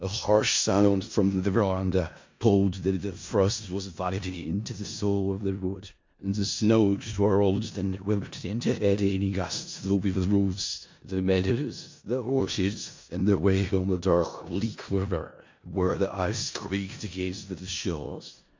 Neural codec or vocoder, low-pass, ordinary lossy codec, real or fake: codec, 16 kHz in and 24 kHz out, 0.4 kbps, LongCat-Audio-Codec, four codebook decoder; 7.2 kHz; MP3, 48 kbps; fake